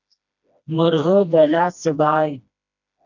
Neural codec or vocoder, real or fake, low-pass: codec, 16 kHz, 1 kbps, FreqCodec, smaller model; fake; 7.2 kHz